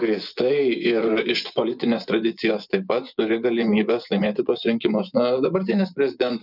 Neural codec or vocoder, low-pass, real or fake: vocoder, 24 kHz, 100 mel bands, Vocos; 5.4 kHz; fake